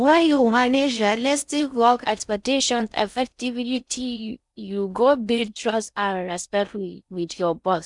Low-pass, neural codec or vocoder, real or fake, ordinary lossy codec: 10.8 kHz; codec, 16 kHz in and 24 kHz out, 0.6 kbps, FocalCodec, streaming, 4096 codes; fake; none